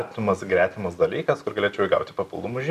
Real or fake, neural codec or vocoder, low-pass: real; none; 14.4 kHz